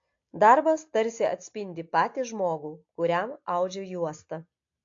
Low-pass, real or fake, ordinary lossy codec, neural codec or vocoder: 7.2 kHz; real; AAC, 48 kbps; none